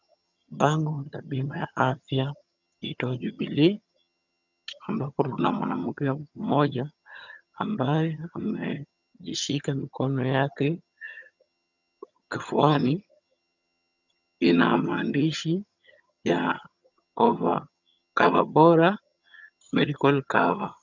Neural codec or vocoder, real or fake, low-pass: vocoder, 22.05 kHz, 80 mel bands, HiFi-GAN; fake; 7.2 kHz